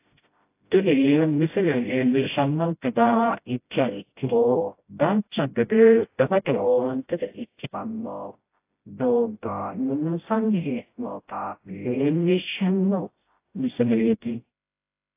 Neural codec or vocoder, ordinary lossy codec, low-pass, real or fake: codec, 16 kHz, 0.5 kbps, FreqCodec, smaller model; AAC, 24 kbps; 3.6 kHz; fake